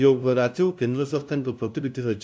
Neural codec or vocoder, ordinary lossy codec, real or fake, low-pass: codec, 16 kHz, 0.5 kbps, FunCodec, trained on LibriTTS, 25 frames a second; none; fake; none